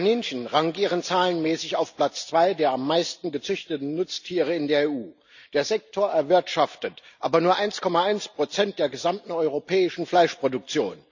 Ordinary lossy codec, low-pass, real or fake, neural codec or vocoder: none; 7.2 kHz; real; none